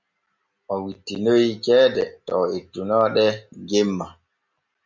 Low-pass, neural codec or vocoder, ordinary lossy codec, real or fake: 7.2 kHz; none; MP3, 64 kbps; real